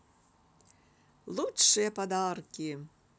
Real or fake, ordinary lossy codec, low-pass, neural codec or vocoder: real; none; none; none